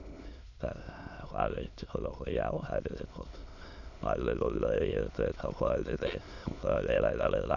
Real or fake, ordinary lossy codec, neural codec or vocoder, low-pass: fake; none; autoencoder, 22.05 kHz, a latent of 192 numbers a frame, VITS, trained on many speakers; 7.2 kHz